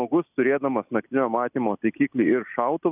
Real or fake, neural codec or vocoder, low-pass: real; none; 3.6 kHz